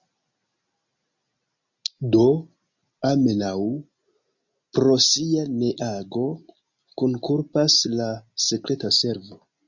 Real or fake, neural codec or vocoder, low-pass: real; none; 7.2 kHz